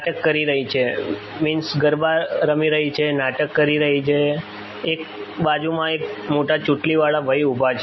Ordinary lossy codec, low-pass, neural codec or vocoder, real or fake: MP3, 24 kbps; 7.2 kHz; none; real